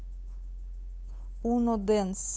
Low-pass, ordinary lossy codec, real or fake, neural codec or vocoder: none; none; fake; codec, 16 kHz, 8 kbps, FunCodec, trained on Chinese and English, 25 frames a second